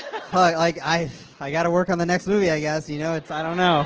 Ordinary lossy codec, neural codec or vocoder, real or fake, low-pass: Opus, 16 kbps; none; real; 7.2 kHz